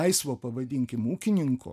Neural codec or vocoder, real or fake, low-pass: none; real; 14.4 kHz